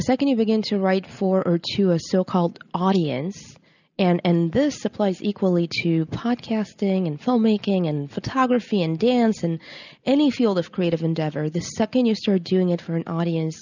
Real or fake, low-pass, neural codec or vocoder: real; 7.2 kHz; none